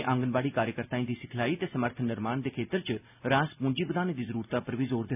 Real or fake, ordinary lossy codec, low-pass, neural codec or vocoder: real; none; 3.6 kHz; none